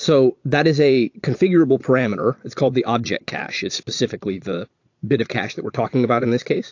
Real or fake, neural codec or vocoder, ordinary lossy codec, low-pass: fake; vocoder, 22.05 kHz, 80 mel bands, Vocos; AAC, 48 kbps; 7.2 kHz